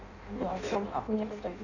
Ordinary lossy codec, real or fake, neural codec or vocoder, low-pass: none; fake; codec, 16 kHz in and 24 kHz out, 0.6 kbps, FireRedTTS-2 codec; 7.2 kHz